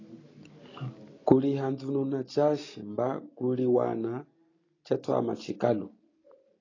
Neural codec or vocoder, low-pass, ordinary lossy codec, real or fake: none; 7.2 kHz; AAC, 32 kbps; real